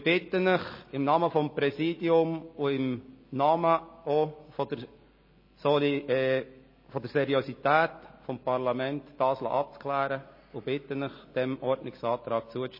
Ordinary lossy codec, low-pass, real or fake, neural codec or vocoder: MP3, 24 kbps; 5.4 kHz; real; none